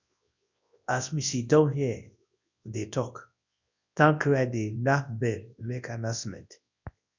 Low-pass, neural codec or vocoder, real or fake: 7.2 kHz; codec, 24 kHz, 0.9 kbps, WavTokenizer, large speech release; fake